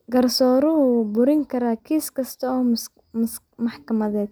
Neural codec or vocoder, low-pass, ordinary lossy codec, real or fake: none; none; none; real